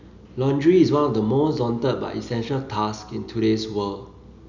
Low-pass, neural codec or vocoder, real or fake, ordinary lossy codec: 7.2 kHz; none; real; none